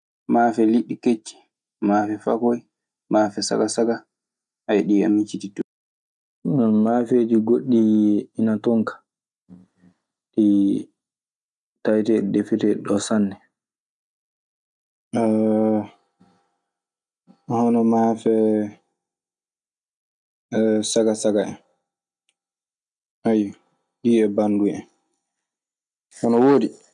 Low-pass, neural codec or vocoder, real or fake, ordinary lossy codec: 10.8 kHz; none; real; none